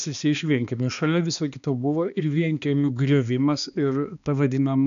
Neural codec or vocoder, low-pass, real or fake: codec, 16 kHz, 2 kbps, X-Codec, HuBERT features, trained on balanced general audio; 7.2 kHz; fake